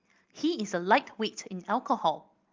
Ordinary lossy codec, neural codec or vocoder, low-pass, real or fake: Opus, 32 kbps; none; 7.2 kHz; real